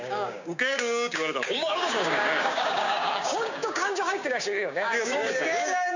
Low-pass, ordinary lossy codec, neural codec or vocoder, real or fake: 7.2 kHz; none; codec, 16 kHz, 6 kbps, DAC; fake